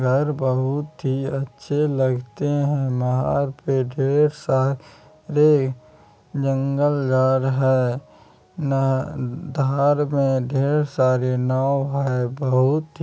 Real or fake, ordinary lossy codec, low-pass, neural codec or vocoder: real; none; none; none